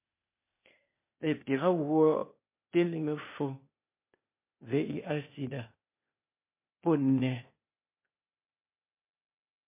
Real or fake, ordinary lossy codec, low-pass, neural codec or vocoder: fake; MP3, 24 kbps; 3.6 kHz; codec, 16 kHz, 0.8 kbps, ZipCodec